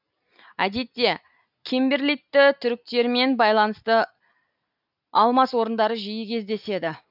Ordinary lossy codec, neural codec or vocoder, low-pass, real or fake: none; none; 5.4 kHz; real